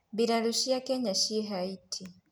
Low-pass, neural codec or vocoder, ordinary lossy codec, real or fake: none; none; none; real